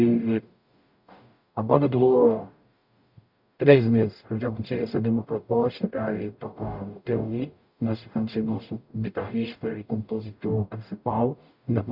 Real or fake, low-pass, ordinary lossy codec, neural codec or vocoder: fake; 5.4 kHz; none; codec, 44.1 kHz, 0.9 kbps, DAC